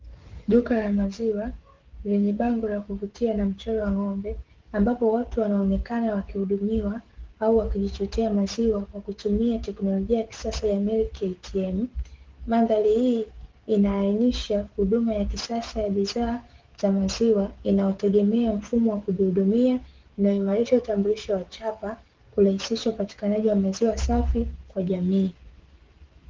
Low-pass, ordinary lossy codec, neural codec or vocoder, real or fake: 7.2 kHz; Opus, 16 kbps; codec, 16 kHz, 16 kbps, FreqCodec, smaller model; fake